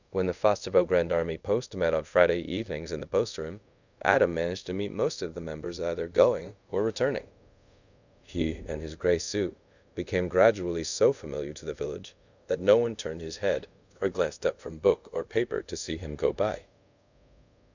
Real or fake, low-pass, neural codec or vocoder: fake; 7.2 kHz; codec, 24 kHz, 0.5 kbps, DualCodec